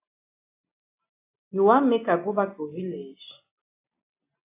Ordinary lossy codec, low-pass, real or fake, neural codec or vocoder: AAC, 24 kbps; 3.6 kHz; real; none